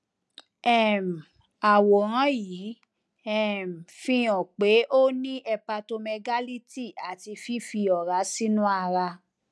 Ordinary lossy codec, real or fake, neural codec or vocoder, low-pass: none; real; none; none